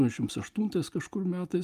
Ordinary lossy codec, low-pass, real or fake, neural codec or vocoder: Opus, 32 kbps; 14.4 kHz; real; none